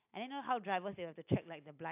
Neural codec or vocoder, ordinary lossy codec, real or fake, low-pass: none; AAC, 32 kbps; real; 3.6 kHz